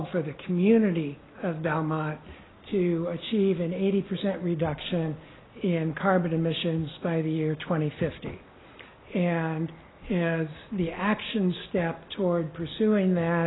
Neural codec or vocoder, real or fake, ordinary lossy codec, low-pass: none; real; AAC, 16 kbps; 7.2 kHz